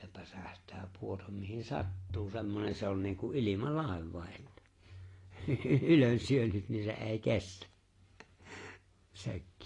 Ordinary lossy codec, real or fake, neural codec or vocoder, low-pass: AAC, 32 kbps; real; none; 10.8 kHz